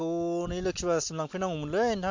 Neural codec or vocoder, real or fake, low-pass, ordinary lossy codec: none; real; 7.2 kHz; MP3, 48 kbps